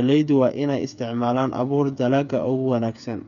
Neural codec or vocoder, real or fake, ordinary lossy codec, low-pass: codec, 16 kHz, 8 kbps, FreqCodec, smaller model; fake; none; 7.2 kHz